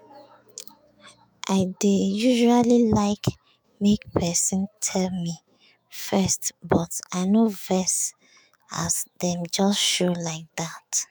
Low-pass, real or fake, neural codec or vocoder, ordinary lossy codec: none; fake; autoencoder, 48 kHz, 128 numbers a frame, DAC-VAE, trained on Japanese speech; none